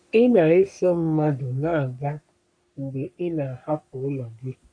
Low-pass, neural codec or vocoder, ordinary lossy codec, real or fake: 9.9 kHz; codec, 44.1 kHz, 3.4 kbps, Pupu-Codec; MP3, 96 kbps; fake